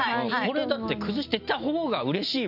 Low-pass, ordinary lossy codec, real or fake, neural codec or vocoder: 5.4 kHz; none; real; none